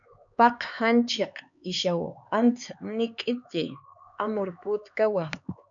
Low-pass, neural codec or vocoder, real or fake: 7.2 kHz; codec, 16 kHz, 4 kbps, X-Codec, HuBERT features, trained on LibriSpeech; fake